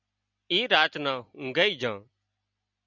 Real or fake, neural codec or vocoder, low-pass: real; none; 7.2 kHz